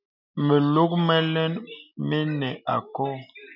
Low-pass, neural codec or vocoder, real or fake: 5.4 kHz; none; real